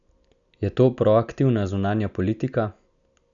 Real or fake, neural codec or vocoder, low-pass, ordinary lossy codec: real; none; 7.2 kHz; none